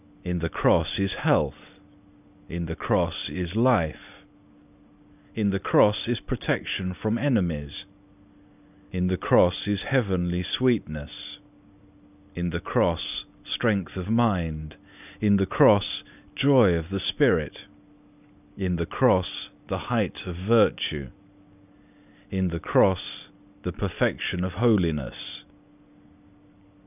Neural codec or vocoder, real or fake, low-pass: none; real; 3.6 kHz